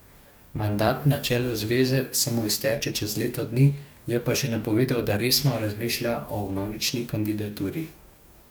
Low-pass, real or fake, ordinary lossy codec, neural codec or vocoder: none; fake; none; codec, 44.1 kHz, 2.6 kbps, DAC